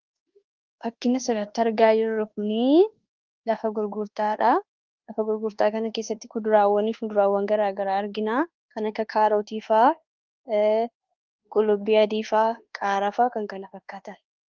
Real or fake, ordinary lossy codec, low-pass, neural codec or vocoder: fake; Opus, 16 kbps; 7.2 kHz; codec, 24 kHz, 1.2 kbps, DualCodec